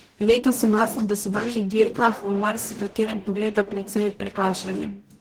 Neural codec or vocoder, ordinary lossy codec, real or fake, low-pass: codec, 44.1 kHz, 0.9 kbps, DAC; Opus, 16 kbps; fake; 19.8 kHz